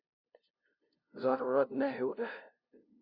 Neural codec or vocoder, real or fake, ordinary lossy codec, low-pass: codec, 16 kHz, 0.5 kbps, FunCodec, trained on LibriTTS, 25 frames a second; fake; Opus, 64 kbps; 5.4 kHz